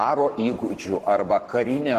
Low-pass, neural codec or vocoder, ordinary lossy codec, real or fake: 14.4 kHz; codec, 44.1 kHz, 7.8 kbps, Pupu-Codec; Opus, 16 kbps; fake